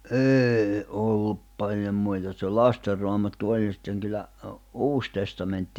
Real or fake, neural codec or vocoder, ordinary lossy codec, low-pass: real; none; none; 19.8 kHz